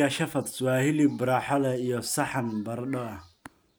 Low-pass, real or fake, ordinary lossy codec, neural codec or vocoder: none; real; none; none